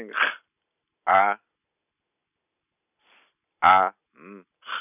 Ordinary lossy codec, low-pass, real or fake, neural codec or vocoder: none; 3.6 kHz; real; none